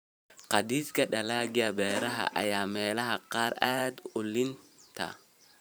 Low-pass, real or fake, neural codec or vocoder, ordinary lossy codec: none; fake; vocoder, 44.1 kHz, 128 mel bands every 512 samples, BigVGAN v2; none